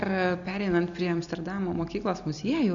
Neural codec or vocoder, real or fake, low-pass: none; real; 7.2 kHz